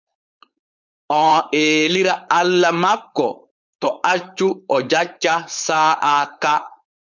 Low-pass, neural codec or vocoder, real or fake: 7.2 kHz; codec, 16 kHz, 4.8 kbps, FACodec; fake